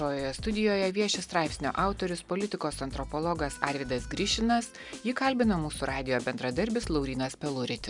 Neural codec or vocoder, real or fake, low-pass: none; real; 10.8 kHz